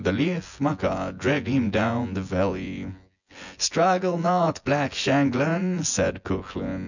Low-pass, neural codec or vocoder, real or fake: 7.2 kHz; vocoder, 24 kHz, 100 mel bands, Vocos; fake